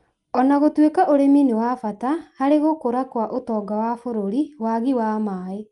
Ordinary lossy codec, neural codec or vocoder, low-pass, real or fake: Opus, 32 kbps; none; 10.8 kHz; real